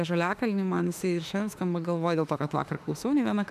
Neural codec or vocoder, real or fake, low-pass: autoencoder, 48 kHz, 32 numbers a frame, DAC-VAE, trained on Japanese speech; fake; 14.4 kHz